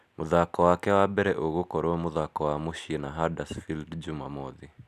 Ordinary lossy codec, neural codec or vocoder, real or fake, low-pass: none; none; real; 14.4 kHz